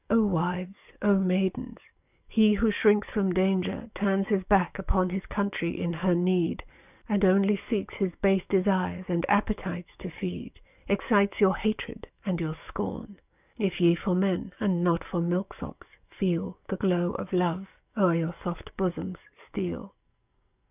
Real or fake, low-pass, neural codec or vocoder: fake; 3.6 kHz; codec, 44.1 kHz, 7.8 kbps, DAC